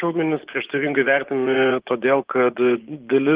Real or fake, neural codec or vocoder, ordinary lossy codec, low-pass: fake; vocoder, 24 kHz, 100 mel bands, Vocos; Opus, 16 kbps; 3.6 kHz